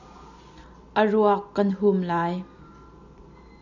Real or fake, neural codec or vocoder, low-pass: real; none; 7.2 kHz